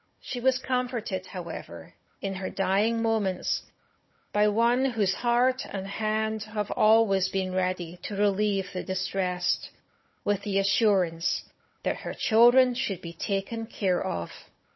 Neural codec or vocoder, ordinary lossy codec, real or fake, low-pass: codec, 16 kHz, 16 kbps, FunCodec, trained on Chinese and English, 50 frames a second; MP3, 24 kbps; fake; 7.2 kHz